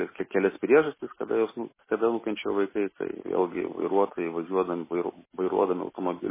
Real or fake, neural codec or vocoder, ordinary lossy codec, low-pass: real; none; MP3, 16 kbps; 3.6 kHz